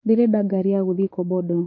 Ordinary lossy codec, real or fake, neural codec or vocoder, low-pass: MP3, 32 kbps; fake; codec, 16 kHz, 6 kbps, DAC; 7.2 kHz